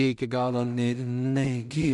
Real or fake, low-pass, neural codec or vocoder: fake; 10.8 kHz; codec, 16 kHz in and 24 kHz out, 0.4 kbps, LongCat-Audio-Codec, two codebook decoder